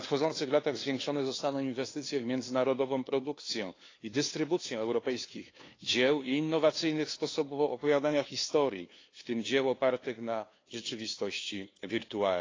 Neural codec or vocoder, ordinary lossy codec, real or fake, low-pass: codec, 16 kHz, 2 kbps, FunCodec, trained on LibriTTS, 25 frames a second; AAC, 32 kbps; fake; 7.2 kHz